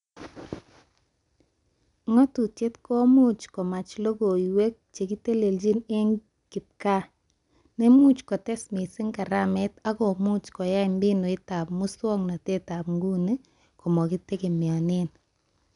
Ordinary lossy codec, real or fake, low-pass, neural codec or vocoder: MP3, 96 kbps; real; 10.8 kHz; none